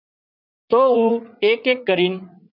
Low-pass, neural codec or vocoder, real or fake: 5.4 kHz; vocoder, 44.1 kHz, 80 mel bands, Vocos; fake